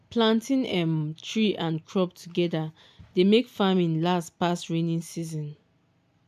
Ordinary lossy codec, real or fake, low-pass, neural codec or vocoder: none; real; 14.4 kHz; none